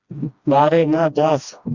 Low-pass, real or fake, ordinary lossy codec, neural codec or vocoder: 7.2 kHz; fake; Opus, 64 kbps; codec, 16 kHz, 1 kbps, FreqCodec, smaller model